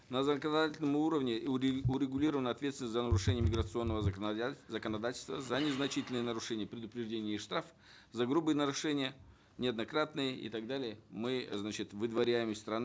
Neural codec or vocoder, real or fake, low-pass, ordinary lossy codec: none; real; none; none